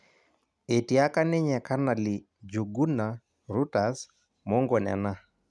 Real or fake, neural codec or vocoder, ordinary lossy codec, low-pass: real; none; none; none